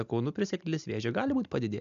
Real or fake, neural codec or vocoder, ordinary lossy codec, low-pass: real; none; MP3, 64 kbps; 7.2 kHz